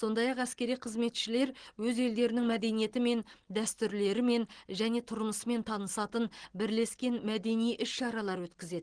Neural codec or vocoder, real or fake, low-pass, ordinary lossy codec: none; real; 9.9 kHz; Opus, 16 kbps